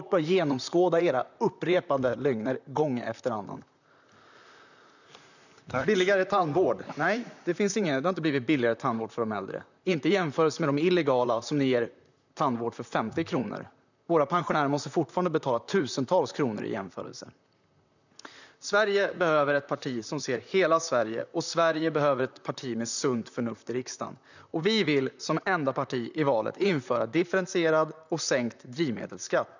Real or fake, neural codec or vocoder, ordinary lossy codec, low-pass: fake; vocoder, 44.1 kHz, 128 mel bands, Pupu-Vocoder; none; 7.2 kHz